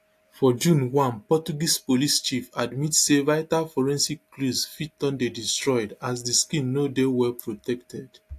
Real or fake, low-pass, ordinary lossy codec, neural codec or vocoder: real; 14.4 kHz; AAC, 64 kbps; none